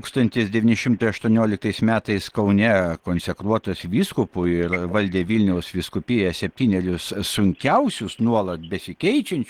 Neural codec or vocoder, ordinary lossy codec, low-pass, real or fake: none; Opus, 16 kbps; 19.8 kHz; real